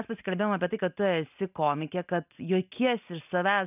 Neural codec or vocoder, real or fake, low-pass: none; real; 3.6 kHz